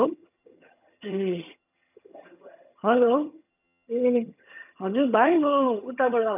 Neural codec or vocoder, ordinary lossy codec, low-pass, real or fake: vocoder, 22.05 kHz, 80 mel bands, HiFi-GAN; none; 3.6 kHz; fake